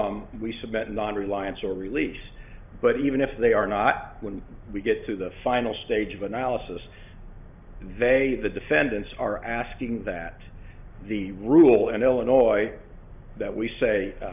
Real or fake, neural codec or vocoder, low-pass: real; none; 3.6 kHz